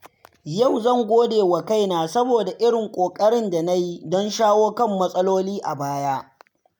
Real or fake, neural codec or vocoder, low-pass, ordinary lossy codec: real; none; none; none